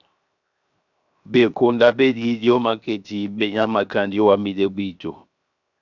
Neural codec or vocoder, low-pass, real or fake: codec, 16 kHz, 0.7 kbps, FocalCodec; 7.2 kHz; fake